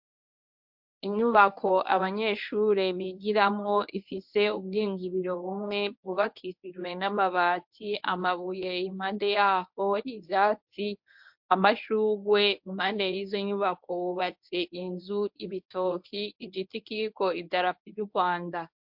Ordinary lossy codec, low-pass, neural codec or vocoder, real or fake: MP3, 48 kbps; 5.4 kHz; codec, 24 kHz, 0.9 kbps, WavTokenizer, medium speech release version 1; fake